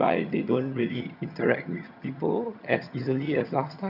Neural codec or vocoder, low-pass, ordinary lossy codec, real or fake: vocoder, 22.05 kHz, 80 mel bands, HiFi-GAN; 5.4 kHz; none; fake